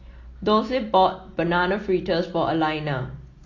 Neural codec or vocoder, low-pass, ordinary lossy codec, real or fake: none; 7.2 kHz; AAC, 32 kbps; real